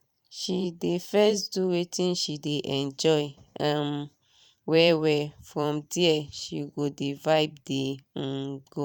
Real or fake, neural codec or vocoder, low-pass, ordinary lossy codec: fake; vocoder, 44.1 kHz, 128 mel bands every 512 samples, BigVGAN v2; 19.8 kHz; none